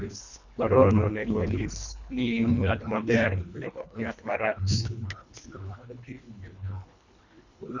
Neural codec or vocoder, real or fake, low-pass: codec, 24 kHz, 1.5 kbps, HILCodec; fake; 7.2 kHz